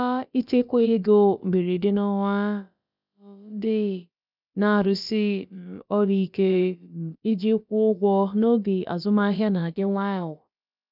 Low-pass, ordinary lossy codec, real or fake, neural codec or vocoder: 5.4 kHz; none; fake; codec, 16 kHz, about 1 kbps, DyCAST, with the encoder's durations